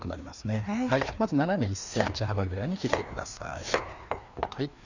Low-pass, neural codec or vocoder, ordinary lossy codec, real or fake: 7.2 kHz; codec, 16 kHz, 2 kbps, FreqCodec, larger model; none; fake